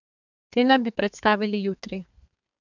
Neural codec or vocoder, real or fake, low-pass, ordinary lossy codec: codec, 32 kHz, 1.9 kbps, SNAC; fake; 7.2 kHz; none